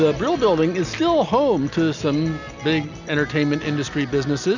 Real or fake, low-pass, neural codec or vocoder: real; 7.2 kHz; none